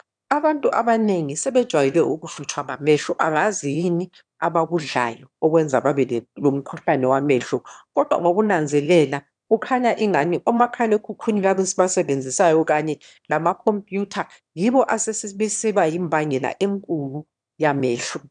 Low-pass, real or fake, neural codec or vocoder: 9.9 kHz; fake; autoencoder, 22.05 kHz, a latent of 192 numbers a frame, VITS, trained on one speaker